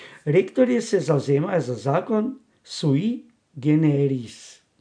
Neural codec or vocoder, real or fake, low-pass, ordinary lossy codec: none; real; 9.9 kHz; none